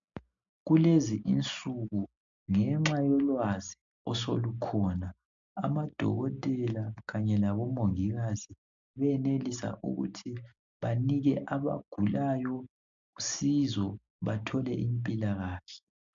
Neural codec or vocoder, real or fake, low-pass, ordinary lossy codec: none; real; 7.2 kHz; MP3, 64 kbps